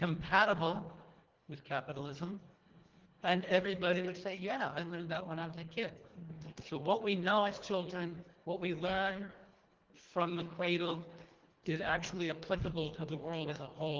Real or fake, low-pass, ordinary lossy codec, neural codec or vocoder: fake; 7.2 kHz; Opus, 16 kbps; codec, 24 kHz, 1.5 kbps, HILCodec